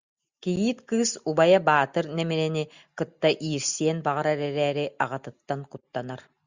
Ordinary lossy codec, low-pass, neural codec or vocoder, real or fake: Opus, 64 kbps; 7.2 kHz; none; real